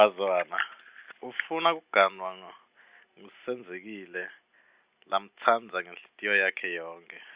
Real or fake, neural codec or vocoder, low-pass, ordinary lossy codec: real; none; 3.6 kHz; Opus, 64 kbps